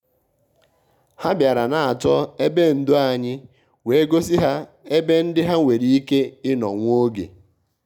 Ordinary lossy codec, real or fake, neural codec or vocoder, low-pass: none; real; none; 19.8 kHz